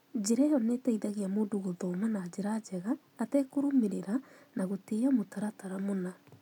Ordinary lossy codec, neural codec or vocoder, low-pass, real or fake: none; none; 19.8 kHz; real